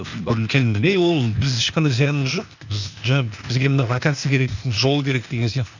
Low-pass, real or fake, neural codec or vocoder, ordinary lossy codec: 7.2 kHz; fake; codec, 16 kHz, 0.8 kbps, ZipCodec; none